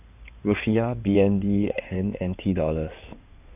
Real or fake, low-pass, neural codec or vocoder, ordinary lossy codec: fake; 3.6 kHz; codec, 16 kHz in and 24 kHz out, 2.2 kbps, FireRedTTS-2 codec; none